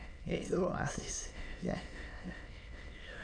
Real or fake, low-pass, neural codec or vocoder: fake; 9.9 kHz; autoencoder, 22.05 kHz, a latent of 192 numbers a frame, VITS, trained on many speakers